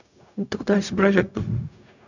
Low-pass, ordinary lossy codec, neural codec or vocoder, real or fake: 7.2 kHz; none; codec, 16 kHz, 0.4 kbps, LongCat-Audio-Codec; fake